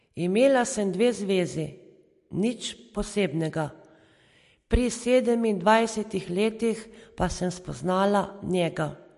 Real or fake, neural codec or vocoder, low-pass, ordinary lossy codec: real; none; 14.4 kHz; MP3, 48 kbps